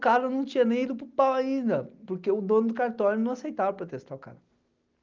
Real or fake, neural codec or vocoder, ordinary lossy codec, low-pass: real; none; Opus, 32 kbps; 7.2 kHz